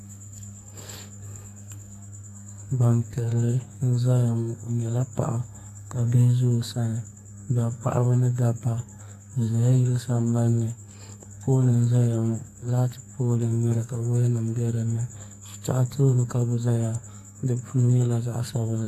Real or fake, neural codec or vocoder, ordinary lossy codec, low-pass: fake; codec, 44.1 kHz, 2.6 kbps, SNAC; AAC, 64 kbps; 14.4 kHz